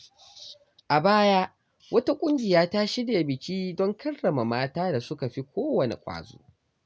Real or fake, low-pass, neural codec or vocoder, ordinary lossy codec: real; none; none; none